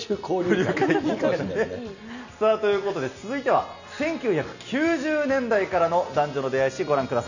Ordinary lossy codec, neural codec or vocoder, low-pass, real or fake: none; none; 7.2 kHz; real